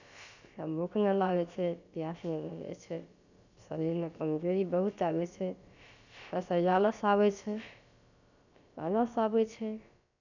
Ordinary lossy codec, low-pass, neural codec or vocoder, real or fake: none; 7.2 kHz; codec, 16 kHz, about 1 kbps, DyCAST, with the encoder's durations; fake